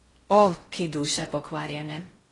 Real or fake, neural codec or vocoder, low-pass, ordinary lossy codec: fake; codec, 16 kHz in and 24 kHz out, 0.6 kbps, FocalCodec, streaming, 4096 codes; 10.8 kHz; AAC, 32 kbps